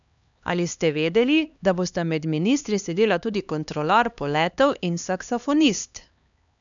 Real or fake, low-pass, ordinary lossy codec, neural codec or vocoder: fake; 7.2 kHz; none; codec, 16 kHz, 2 kbps, X-Codec, HuBERT features, trained on LibriSpeech